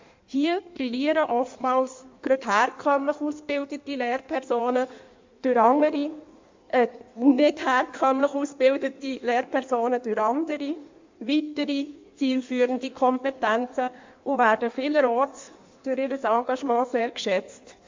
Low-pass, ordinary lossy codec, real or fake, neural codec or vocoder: 7.2 kHz; MP3, 64 kbps; fake; codec, 16 kHz in and 24 kHz out, 1.1 kbps, FireRedTTS-2 codec